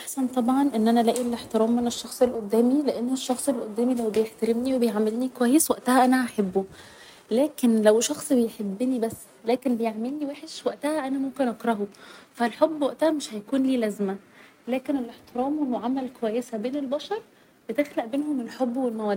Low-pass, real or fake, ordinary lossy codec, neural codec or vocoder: 19.8 kHz; real; none; none